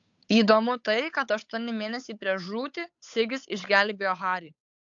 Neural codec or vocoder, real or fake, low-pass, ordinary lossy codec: codec, 16 kHz, 8 kbps, FunCodec, trained on Chinese and English, 25 frames a second; fake; 7.2 kHz; AAC, 64 kbps